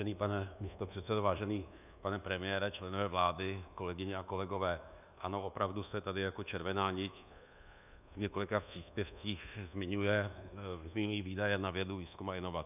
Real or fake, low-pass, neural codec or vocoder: fake; 3.6 kHz; codec, 24 kHz, 1.2 kbps, DualCodec